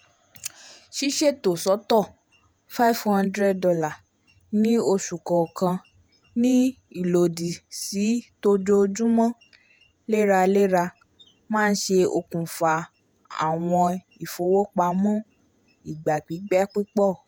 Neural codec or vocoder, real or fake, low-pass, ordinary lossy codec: vocoder, 48 kHz, 128 mel bands, Vocos; fake; none; none